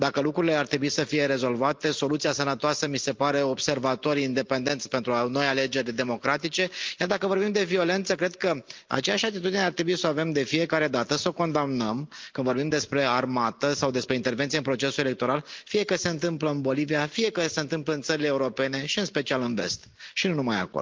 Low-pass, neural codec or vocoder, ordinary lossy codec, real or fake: 7.2 kHz; none; Opus, 32 kbps; real